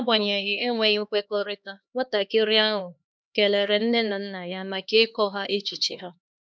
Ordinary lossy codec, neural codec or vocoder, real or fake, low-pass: none; codec, 16 kHz, 2 kbps, X-Codec, HuBERT features, trained on LibriSpeech; fake; none